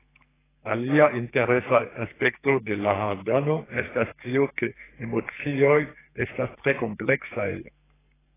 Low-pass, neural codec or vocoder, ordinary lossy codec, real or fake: 3.6 kHz; codec, 44.1 kHz, 2.6 kbps, SNAC; AAC, 16 kbps; fake